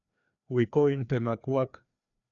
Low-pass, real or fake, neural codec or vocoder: 7.2 kHz; fake; codec, 16 kHz, 2 kbps, FreqCodec, larger model